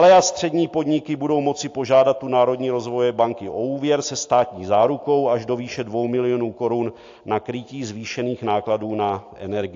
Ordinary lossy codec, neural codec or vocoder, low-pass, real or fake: AAC, 48 kbps; none; 7.2 kHz; real